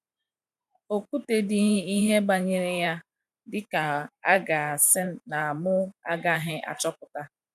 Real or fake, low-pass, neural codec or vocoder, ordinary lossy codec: fake; 14.4 kHz; vocoder, 44.1 kHz, 128 mel bands every 512 samples, BigVGAN v2; none